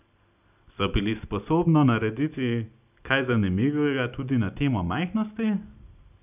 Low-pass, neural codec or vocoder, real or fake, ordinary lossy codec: 3.6 kHz; none; real; none